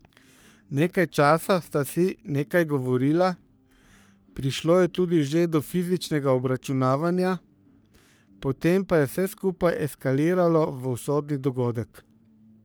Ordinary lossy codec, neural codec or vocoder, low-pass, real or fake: none; codec, 44.1 kHz, 3.4 kbps, Pupu-Codec; none; fake